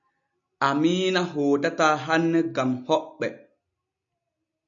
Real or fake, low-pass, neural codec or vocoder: real; 7.2 kHz; none